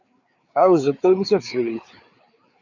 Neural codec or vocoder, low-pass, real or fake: codec, 16 kHz, 4 kbps, FreqCodec, larger model; 7.2 kHz; fake